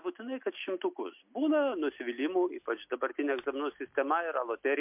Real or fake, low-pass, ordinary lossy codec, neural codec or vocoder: real; 3.6 kHz; MP3, 32 kbps; none